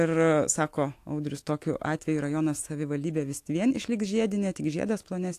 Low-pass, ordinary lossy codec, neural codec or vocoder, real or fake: 14.4 kHz; AAC, 64 kbps; autoencoder, 48 kHz, 128 numbers a frame, DAC-VAE, trained on Japanese speech; fake